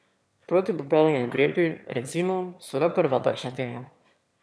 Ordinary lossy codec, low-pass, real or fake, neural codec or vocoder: none; none; fake; autoencoder, 22.05 kHz, a latent of 192 numbers a frame, VITS, trained on one speaker